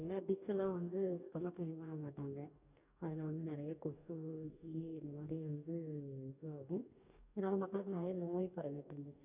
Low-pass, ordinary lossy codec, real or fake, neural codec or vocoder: 3.6 kHz; none; fake; codec, 44.1 kHz, 2.6 kbps, DAC